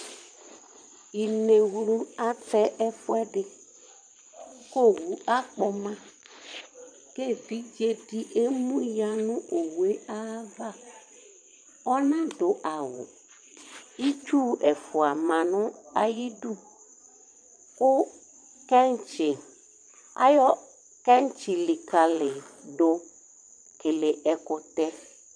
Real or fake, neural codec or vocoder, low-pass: fake; vocoder, 22.05 kHz, 80 mel bands, Vocos; 9.9 kHz